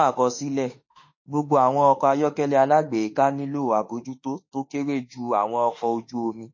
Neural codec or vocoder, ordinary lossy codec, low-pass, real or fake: autoencoder, 48 kHz, 32 numbers a frame, DAC-VAE, trained on Japanese speech; MP3, 32 kbps; 7.2 kHz; fake